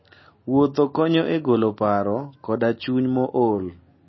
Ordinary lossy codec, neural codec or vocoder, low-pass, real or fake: MP3, 24 kbps; none; 7.2 kHz; real